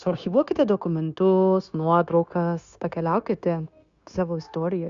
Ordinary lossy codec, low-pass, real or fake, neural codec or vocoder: Opus, 64 kbps; 7.2 kHz; fake; codec, 16 kHz, 0.9 kbps, LongCat-Audio-Codec